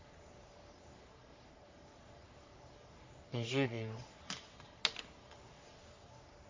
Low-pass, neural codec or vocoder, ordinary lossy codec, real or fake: 7.2 kHz; codec, 44.1 kHz, 3.4 kbps, Pupu-Codec; MP3, 64 kbps; fake